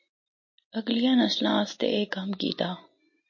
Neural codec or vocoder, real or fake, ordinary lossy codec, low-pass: none; real; MP3, 32 kbps; 7.2 kHz